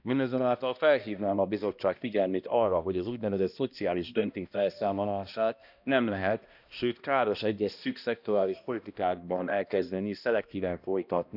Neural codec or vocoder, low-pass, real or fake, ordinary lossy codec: codec, 16 kHz, 1 kbps, X-Codec, HuBERT features, trained on balanced general audio; 5.4 kHz; fake; none